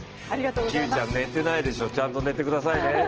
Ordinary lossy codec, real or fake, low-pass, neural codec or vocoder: Opus, 16 kbps; real; 7.2 kHz; none